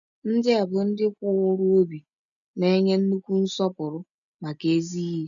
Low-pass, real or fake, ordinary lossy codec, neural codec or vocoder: 7.2 kHz; real; none; none